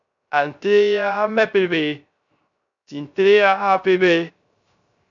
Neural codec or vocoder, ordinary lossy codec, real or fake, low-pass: codec, 16 kHz, 0.3 kbps, FocalCodec; MP3, 96 kbps; fake; 7.2 kHz